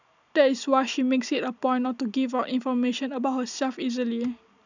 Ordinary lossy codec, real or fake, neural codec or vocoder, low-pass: none; real; none; 7.2 kHz